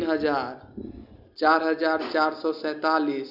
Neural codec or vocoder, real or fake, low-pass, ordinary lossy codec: none; real; 5.4 kHz; none